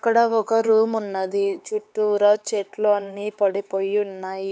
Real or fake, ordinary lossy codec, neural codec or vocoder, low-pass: fake; none; codec, 16 kHz, 4 kbps, X-Codec, HuBERT features, trained on LibriSpeech; none